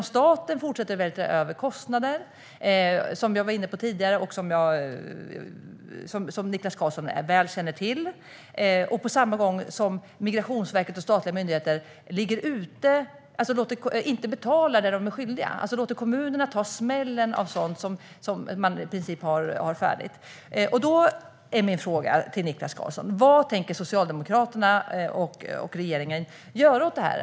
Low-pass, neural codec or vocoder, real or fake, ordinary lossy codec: none; none; real; none